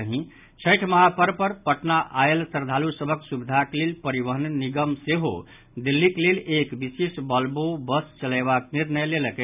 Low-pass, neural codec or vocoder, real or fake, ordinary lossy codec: 3.6 kHz; none; real; none